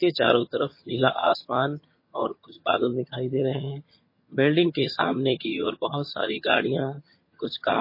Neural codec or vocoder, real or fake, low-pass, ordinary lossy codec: vocoder, 22.05 kHz, 80 mel bands, HiFi-GAN; fake; 5.4 kHz; MP3, 24 kbps